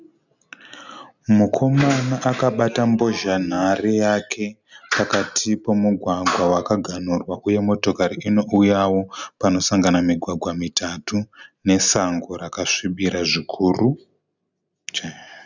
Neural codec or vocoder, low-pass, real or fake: none; 7.2 kHz; real